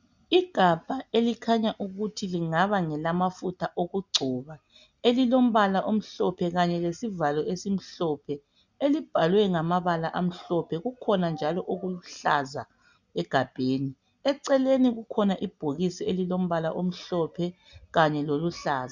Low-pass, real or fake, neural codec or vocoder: 7.2 kHz; real; none